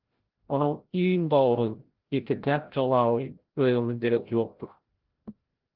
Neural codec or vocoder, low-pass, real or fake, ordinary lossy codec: codec, 16 kHz, 0.5 kbps, FreqCodec, larger model; 5.4 kHz; fake; Opus, 16 kbps